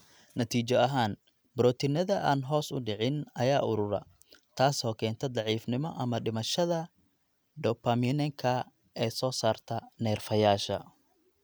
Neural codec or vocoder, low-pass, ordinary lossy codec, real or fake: none; none; none; real